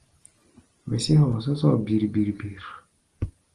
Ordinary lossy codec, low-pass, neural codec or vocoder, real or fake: Opus, 24 kbps; 10.8 kHz; none; real